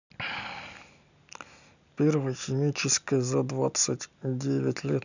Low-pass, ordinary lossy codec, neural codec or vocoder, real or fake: 7.2 kHz; none; none; real